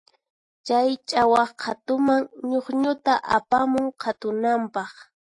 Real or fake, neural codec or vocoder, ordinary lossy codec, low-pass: real; none; AAC, 48 kbps; 10.8 kHz